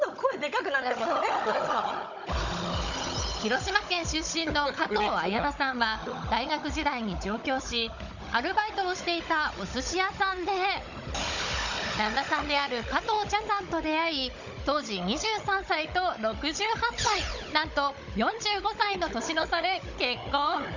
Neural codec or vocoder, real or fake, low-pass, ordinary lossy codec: codec, 16 kHz, 16 kbps, FunCodec, trained on Chinese and English, 50 frames a second; fake; 7.2 kHz; none